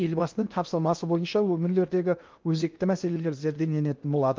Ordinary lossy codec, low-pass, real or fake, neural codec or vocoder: Opus, 24 kbps; 7.2 kHz; fake; codec, 16 kHz in and 24 kHz out, 0.6 kbps, FocalCodec, streaming, 2048 codes